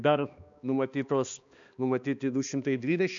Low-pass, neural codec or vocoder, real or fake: 7.2 kHz; codec, 16 kHz, 2 kbps, X-Codec, HuBERT features, trained on balanced general audio; fake